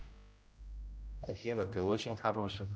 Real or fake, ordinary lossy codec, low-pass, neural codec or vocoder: fake; none; none; codec, 16 kHz, 0.5 kbps, X-Codec, HuBERT features, trained on general audio